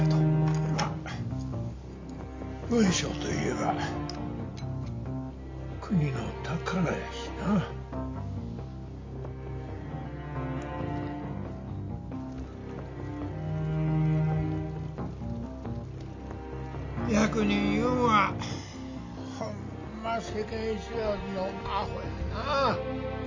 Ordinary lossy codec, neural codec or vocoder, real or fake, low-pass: MP3, 48 kbps; none; real; 7.2 kHz